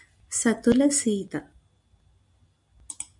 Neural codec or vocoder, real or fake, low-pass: none; real; 10.8 kHz